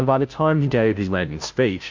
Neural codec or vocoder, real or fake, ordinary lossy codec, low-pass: codec, 16 kHz, 0.5 kbps, FunCodec, trained on Chinese and English, 25 frames a second; fake; MP3, 48 kbps; 7.2 kHz